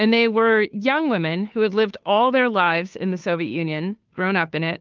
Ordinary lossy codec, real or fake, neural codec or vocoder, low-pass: Opus, 24 kbps; fake; codec, 16 kHz, 2 kbps, FunCodec, trained on Chinese and English, 25 frames a second; 7.2 kHz